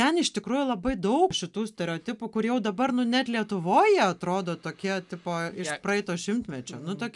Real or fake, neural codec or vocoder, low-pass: real; none; 10.8 kHz